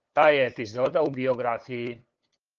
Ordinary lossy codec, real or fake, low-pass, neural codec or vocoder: Opus, 16 kbps; fake; 7.2 kHz; codec, 16 kHz, 8 kbps, FunCodec, trained on LibriTTS, 25 frames a second